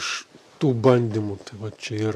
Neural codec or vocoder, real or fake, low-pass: none; real; 14.4 kHz